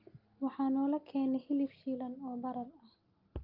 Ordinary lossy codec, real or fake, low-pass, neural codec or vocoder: Opus, 32 kbps; real; 5.4 kHz; none